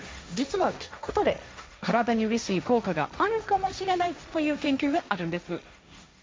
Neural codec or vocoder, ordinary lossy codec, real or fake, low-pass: codec, 16 kHz, 1.1 kbps, Voila-Tokenizer; none; fake; none